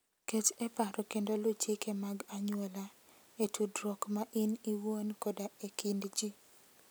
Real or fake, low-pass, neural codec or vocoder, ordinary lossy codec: real; none; none; none